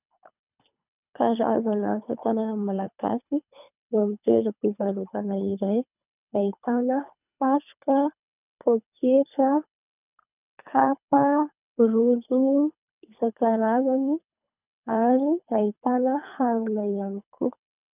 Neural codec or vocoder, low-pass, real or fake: codec, 24 kHz, 3 kbps, HILCodec; 3.6 kHz; fake